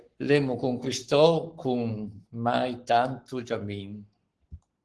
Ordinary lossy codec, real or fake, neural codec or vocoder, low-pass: Opus, 16 kbps; fake; codec, 44.1 kHz, 7.8 kbps, Pupu-Codec; 10.8 kHz